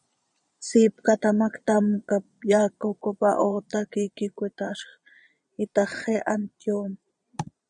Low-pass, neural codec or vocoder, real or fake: 9.9 kHz; vocoder, 22.05 kHz, 80 mel bands, Vocos; fake